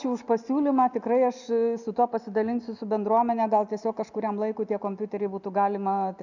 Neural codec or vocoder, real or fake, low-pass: none; real; 7.2 kHz